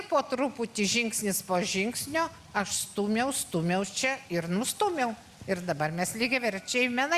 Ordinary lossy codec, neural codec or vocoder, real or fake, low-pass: Opus, 64 kbps; none; real; 14.4 kHz